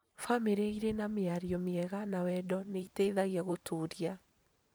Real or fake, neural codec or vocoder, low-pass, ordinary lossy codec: fake; vocoder, 44.1 kHz, 128 mel bands every 512 samples, BigVGAN v2; none; none